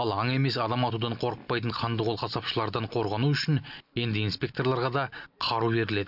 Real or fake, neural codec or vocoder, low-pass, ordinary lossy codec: real; none; 5.4 kHz; none